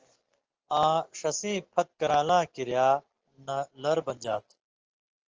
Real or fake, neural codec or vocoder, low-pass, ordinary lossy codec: real; none; 7.2 kHz; Opus, 16 kbps